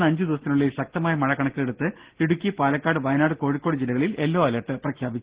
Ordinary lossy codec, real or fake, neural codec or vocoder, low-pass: Opus, 16 kbps; real; none; 3.6 kHz